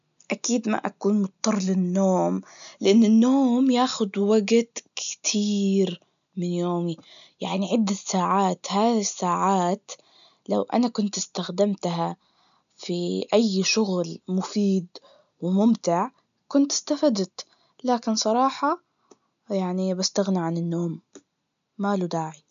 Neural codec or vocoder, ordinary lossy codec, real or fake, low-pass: none; MP3, 96 kbps; real; 7.2 kHz